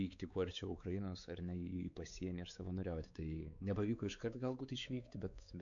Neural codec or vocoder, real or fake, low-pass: codec, 16 kHz, 4 kbps, X-Codec, WavLM features, trained on Multilingual LibriSpeech; fake; 7.2 kHz